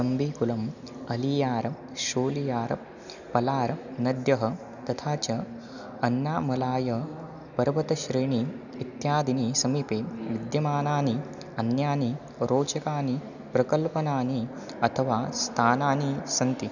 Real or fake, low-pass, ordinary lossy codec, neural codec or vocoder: real; 7.2 kHz; none; none